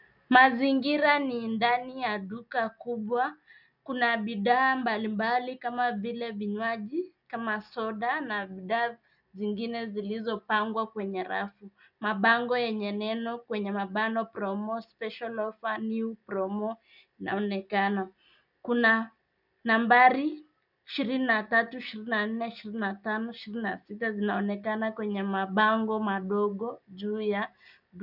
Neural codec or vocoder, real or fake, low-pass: none; real; 5.4 kHz